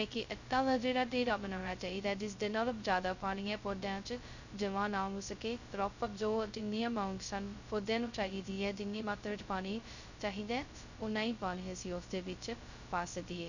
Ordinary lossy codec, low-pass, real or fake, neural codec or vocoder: none; 7.2 kHz; fake; codec, 16 kHz, 0.2 kbps, FocalCodec